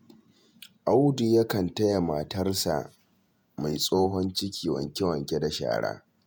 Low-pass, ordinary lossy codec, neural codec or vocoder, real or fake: none; none; vocoder, 48 kHz, 128 mel bands, Vocos; fake